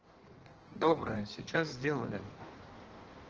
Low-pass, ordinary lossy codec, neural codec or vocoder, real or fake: 7.2 kHz; Opus, 16 kbps; codec, 16 kHz in and 24 kHz out, 1.1 kbps, FireRedTTS-2 codec; fake